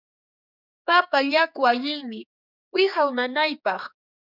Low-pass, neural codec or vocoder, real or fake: 5.4 kHz; codec, 16 kHz, 4 kbps, X-Codec, HuBERT features, trained on general audio; fake